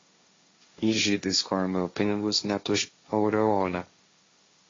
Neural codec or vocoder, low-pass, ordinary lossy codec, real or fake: codec, 16 kHz, 1.1 kbps, Voila-Tokenizer; 7.2 kHz; AAC, 32 kbps; fake